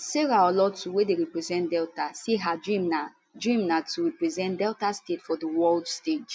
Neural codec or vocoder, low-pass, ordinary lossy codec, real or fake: none; none; none; real